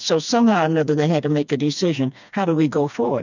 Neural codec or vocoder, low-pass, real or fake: codec, 16 kHz, 2 kbps, FreqCodec, smaller model; 7.2 kHz; fake